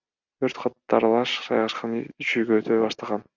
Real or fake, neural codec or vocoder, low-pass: real; none; 7.2 kHz